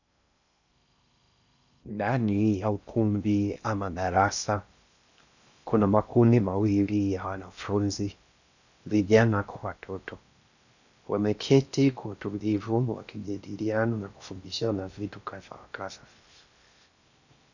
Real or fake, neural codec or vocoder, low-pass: fake; codec, 16 kHz in and 24 kHz out, 0.6 kbps, FocalCodec, streaming, 2048 codes; 7.2 kHz